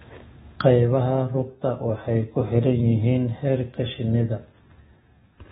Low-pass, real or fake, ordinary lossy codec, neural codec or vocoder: 19.8 kHz; fake; AAC, 16 kbps; autoencoder, 48 kHz, 128 numbers a frame, DAC-VAE, trained on Japanese speech